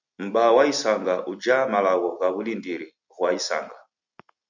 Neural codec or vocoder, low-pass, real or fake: none; 7.2 kHz; real